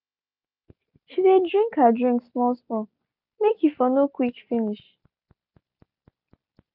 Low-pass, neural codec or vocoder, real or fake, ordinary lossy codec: 5.4 kHz; none; real; none